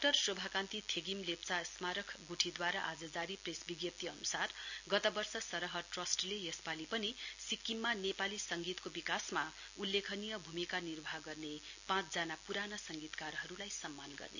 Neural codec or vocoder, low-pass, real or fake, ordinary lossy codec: none; 7.2 kHz; real; none